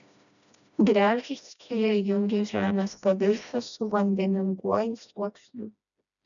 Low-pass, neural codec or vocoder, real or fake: 7.2 kHz; codec, 16 kHz, 1 kbps, FreqCodec, smaller model; fake